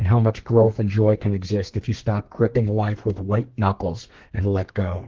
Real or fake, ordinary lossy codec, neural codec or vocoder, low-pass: fake; Opus, 16 kbps; codec, 32 kHz, 1.9 kbps, SNAC; 7.2 kHz